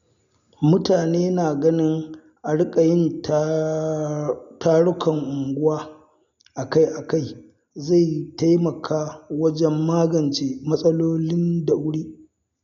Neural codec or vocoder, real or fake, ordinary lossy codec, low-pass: none; real; none; 7.2 kHz